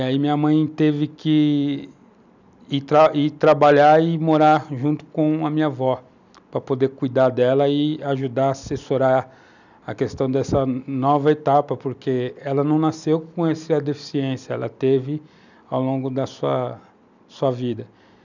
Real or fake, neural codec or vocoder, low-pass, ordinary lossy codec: real; none; 7.2 kHz; none